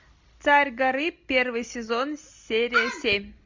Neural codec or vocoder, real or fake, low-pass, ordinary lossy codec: none; real; 7.2 kHz; MP3, 64 kbps